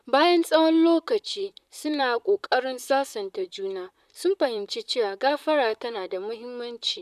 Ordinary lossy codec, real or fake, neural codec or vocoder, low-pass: none; fake; vocoder, 44.1 kHz, 128 mel bands, Pupu-Vocoder; 14.4 kHz